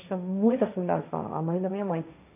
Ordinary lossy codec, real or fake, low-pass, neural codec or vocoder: none; fake; 3.6 kHz; codec, 16 kHz, 1.1 kbps, Voila-Tokenizer